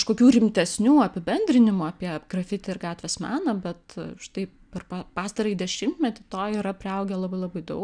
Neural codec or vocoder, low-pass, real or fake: none; 9.9 kHz; real